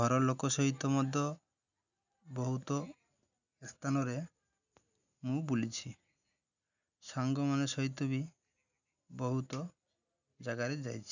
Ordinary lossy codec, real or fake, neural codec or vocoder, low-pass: none; real; none; 7.2 kHz